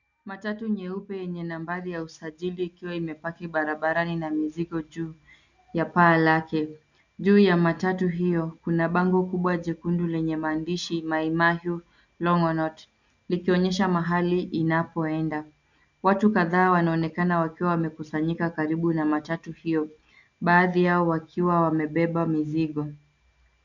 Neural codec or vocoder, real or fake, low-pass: none; real; 7.2 kHz